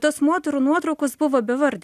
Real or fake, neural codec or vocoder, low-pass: real; none; 14.4 kHz